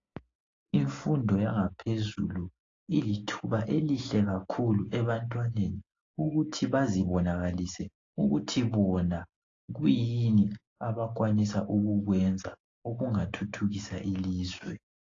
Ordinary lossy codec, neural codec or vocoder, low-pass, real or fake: AAC, 32 kbps; none; 7.2 kHz; real